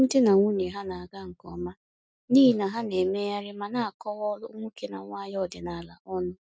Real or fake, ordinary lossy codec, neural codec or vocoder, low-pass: real; none; none; none